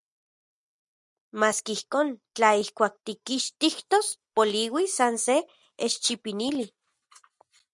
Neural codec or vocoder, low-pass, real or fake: none; 10.8 kHz; real